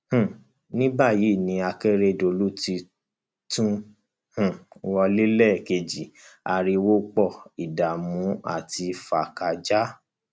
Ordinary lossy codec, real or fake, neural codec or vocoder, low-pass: none; real; none; none